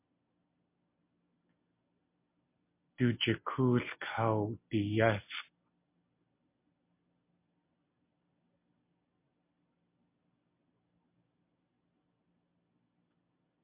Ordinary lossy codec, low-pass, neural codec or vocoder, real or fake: MP3, 24 kbps; 3.6 kHz; none; real